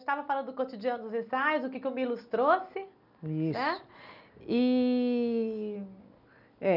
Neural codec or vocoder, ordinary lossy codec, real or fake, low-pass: none; none; real; 5.4 kHz